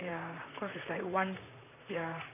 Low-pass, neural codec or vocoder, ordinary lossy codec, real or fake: 3.6 kHz; vocoder, 44.1 kHz, 128 mel bands, Pupu-Vocoder; none; fake